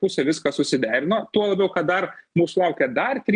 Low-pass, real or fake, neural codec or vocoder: 9.9 kHz; real; none